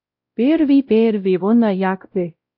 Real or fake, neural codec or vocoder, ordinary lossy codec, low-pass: fake; codec, 16 kHz, 0.5 kbps, X-Codec, WavLM features, trained on Multilingual LibriSpeech; AAC, 48 kbps; 5.4 kHz